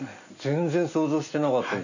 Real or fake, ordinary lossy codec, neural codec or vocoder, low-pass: real; none; none; 7.2 kHz